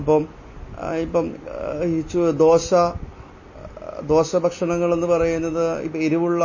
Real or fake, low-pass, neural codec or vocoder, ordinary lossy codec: real; 7.2 kHz; none; MP3, 32 kbps